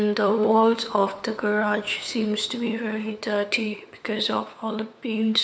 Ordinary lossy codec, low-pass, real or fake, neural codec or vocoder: none; none; fake; codec, 16 kHz, 4 kbps, FunCodec, trained on LibriTTS, 50 frames a second